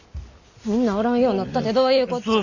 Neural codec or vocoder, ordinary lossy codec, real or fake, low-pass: none; none; real; 7.2 kHz